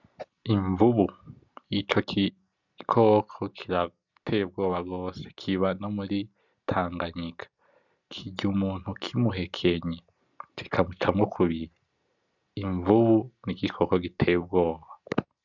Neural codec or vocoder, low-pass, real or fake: none; 7.2 kHz; real